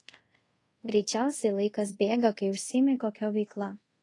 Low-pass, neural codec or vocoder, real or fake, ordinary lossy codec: 10.8 kHz; codec, 24 kHz, 0.5 kbps, DualCodec; fake; AAC, 32 kbps